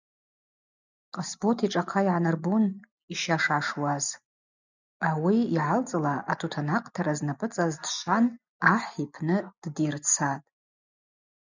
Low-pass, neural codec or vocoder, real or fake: 7.2 kHz; none; real